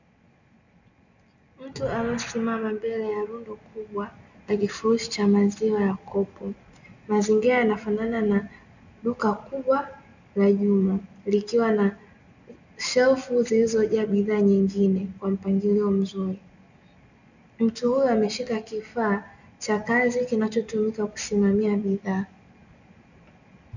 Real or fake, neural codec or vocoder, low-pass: real; none; 7.2 kHz